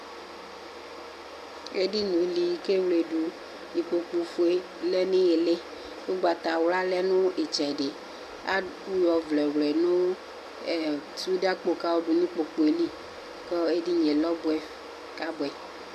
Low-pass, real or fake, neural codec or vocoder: 14.4 kHz; real; none